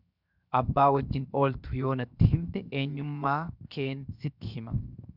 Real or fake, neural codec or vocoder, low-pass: fake; codec, 16 kHz, 0.7 kbps, FocalCodec; 5.4 kHz